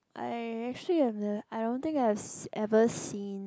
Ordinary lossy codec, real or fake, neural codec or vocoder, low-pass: none; real; none; none